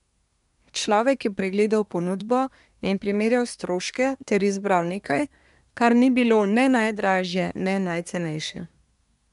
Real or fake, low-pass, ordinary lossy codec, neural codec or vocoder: fake; 10.8 kHz; none; codec, 24 kHz, 1 kbps, SNAC